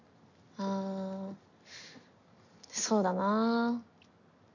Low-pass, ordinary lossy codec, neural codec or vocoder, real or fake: 7.2 kHz; none; none; real